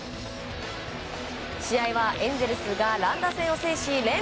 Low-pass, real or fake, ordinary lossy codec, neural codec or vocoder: none; real; none; none